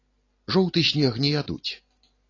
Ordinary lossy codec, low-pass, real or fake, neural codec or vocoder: AAC, 32 kbps; 7.2 kHz; real; none